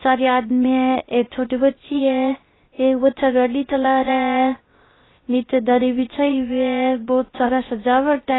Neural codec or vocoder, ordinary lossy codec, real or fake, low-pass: codec, 16 kHz, 0.3 kbps, FocalCodec; AAC, 16 kbps; fake; 7.2 kHz